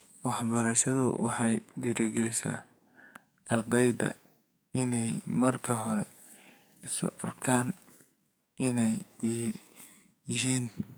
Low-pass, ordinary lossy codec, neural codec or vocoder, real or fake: none; none; codec, 44.1 kHz, 2.6 kbps, SNAC; fake